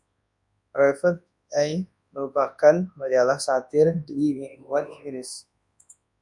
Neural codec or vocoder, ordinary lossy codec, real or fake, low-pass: codec, 24 kHz, 0.9 kbps, WavTokenizer, large speech release; MP3, 96 kbps; fake; 10.8 kHz